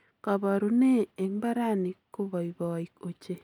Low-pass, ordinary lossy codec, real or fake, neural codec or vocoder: 19.8 kHz; none; real; none